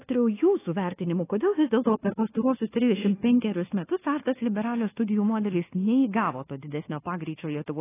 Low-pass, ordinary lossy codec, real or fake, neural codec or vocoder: 3.6 kHz; AAC, 16 kbps; fake; codec, 24 kHz, 1.2 kbps, DualCodec